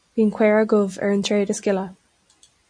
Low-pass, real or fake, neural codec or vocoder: 9.9 kHz; real; none